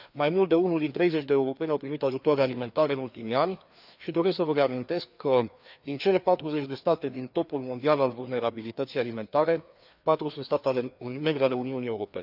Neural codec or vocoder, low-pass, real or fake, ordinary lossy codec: codec, 16 kHz, 2 kbps, FreqCodec, larger model; 5.4 kHz; fake; none